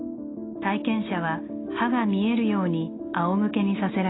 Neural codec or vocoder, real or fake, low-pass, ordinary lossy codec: none; real; 7.2 kHz; AAC, 16 kbps